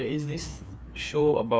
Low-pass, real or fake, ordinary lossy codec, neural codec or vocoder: none; fake; none; codec, 16 kHz, 2 kbps, FreqCodec, larger model